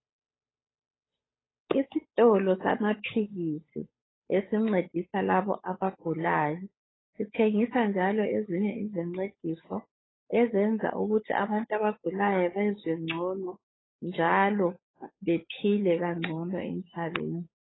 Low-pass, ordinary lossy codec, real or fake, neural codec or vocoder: 7.2 kHz; AAC, 16 kbps; fake; codec, 16 kHz, 8 kbps, FunCodec, trained on Chinese and English, 25 frames a second